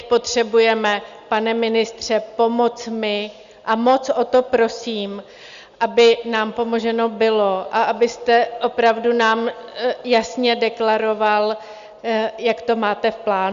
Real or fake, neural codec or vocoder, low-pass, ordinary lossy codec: real; none; 7.2 kHz; Opus, 64 kbps